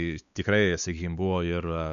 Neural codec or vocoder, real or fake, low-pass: codec, 16 kHz, 4 kbps, X-Codec, WavLM features, trained on Multilingual LibriSpeech; fake; 7.2 kHz